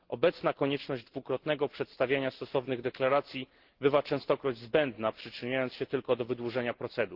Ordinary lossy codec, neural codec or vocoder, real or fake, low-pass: Opus, 16 kbps; none; real; 5.4 kHz